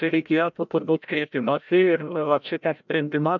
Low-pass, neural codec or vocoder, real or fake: 7.2 kHz; codec, 16 kHz, 0.5 kbps, FreqCodec, larger model; fake